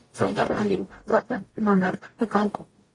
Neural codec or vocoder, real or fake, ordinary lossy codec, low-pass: codec, 44.1 kHz, 0.9 kbps, DAC; fake; AAC, 32 kbps; 10.8 kHz